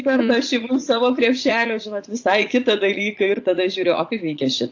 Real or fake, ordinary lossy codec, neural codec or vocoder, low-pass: fake; AAC, 48 kbps; vocoder, 44.1 kHz, 80 mel bands, Vocos; 7.2 kHz